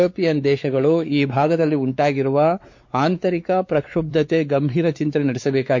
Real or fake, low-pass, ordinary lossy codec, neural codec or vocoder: fake; 7.2 kHz; MP3, 48 kbps; codec, 16 kHz, 4 kbps, X-Codec, WavLM features, trained on Multilingual LibriSpeech